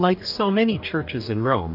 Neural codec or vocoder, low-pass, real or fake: codec, 44.1 kHz, 2.6 kbps, DAC; 5.4 kHz; fake